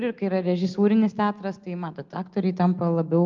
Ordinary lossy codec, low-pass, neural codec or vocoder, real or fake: Opus, 32 kbps; 7.2 kHz; none; real